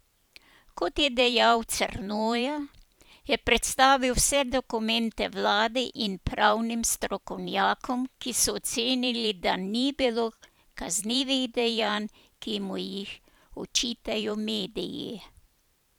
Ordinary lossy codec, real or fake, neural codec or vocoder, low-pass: none; fake; vocoder, 44.1 kHz, 128 mel bands every 512 samples, BigVGAN v2; none